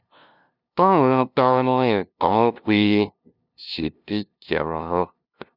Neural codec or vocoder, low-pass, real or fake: codec, 16 kHz, 0.5 kbps, FunCodec, trained on LibriTTS, 25 frames a second; 5.4 kHz; fake